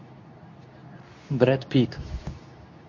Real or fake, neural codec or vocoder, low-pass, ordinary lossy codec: fake; codec, 24 kHz, 0.9 kbps, WavTokenizer, medium speech release version 2; 7.2 kHz; MP3, 48 kbps